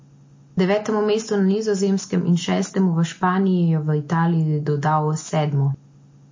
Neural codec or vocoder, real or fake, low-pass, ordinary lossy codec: none; real; 7.2 kHz; MP3, 32 kbps